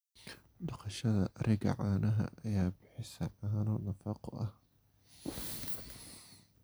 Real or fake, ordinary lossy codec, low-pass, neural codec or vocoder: real; none; none; none